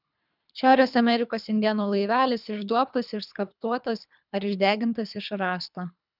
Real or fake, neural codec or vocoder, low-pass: fake; codec, 24 kHz, 3 kbps, HILCodec; 5.4 kHz